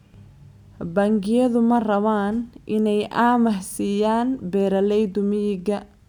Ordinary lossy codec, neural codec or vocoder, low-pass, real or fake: none; none; 19.8 kHz; real